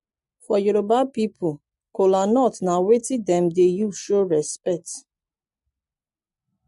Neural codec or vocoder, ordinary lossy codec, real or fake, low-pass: none; MP3, 48 kbps; real; 14.4 kHz